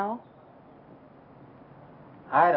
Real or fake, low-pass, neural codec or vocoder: real; 5.4 kHz; none